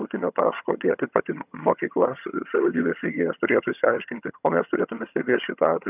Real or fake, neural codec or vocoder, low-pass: fake; vocoder, 22.05 kHz, 80 mel bands, HiFi-GAN; 3.6 kHz